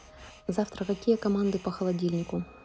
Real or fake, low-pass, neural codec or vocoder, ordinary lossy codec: real; none; none; none